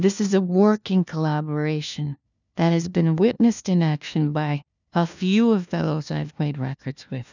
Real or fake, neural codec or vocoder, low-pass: fake; codec, 16 kHz, 1 kbps, FunCodec, trained on LibriTTS, 50 frames a second; 7.2 kHz